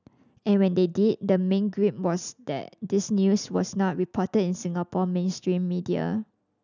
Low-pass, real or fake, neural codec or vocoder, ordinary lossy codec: 7.2 kHz; real; none; none